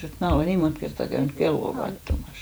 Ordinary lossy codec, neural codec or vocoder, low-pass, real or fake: none; vocoder, 48 kHz, 128 mel bands, Vocos; none; fake